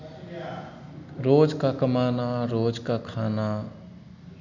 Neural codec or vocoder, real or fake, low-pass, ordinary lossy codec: none; real; 7.2 kHz; none